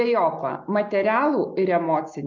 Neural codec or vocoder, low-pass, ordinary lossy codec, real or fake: none; 7.2 kHz; MP3, 64 kbps; real